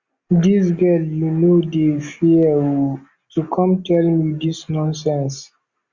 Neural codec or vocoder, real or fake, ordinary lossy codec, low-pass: none; real; Opus, 64 kbps; 7.2 kHz